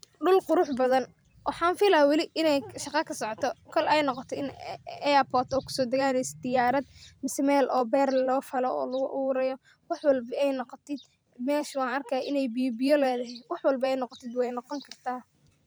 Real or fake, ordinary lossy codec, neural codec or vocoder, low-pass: fake; none; vocoder, 44.1 kHz, 128 mel bands every 512 samples, BigVGAN v2; none